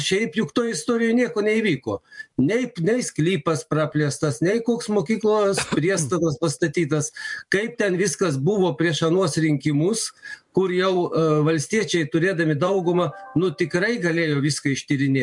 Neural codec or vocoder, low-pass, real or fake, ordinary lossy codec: vocoder, 44.1 kHz, 128 mel bands every 512 samples, BigVGAN v2; 10.8 kHz; fake; MP3, 64 kbps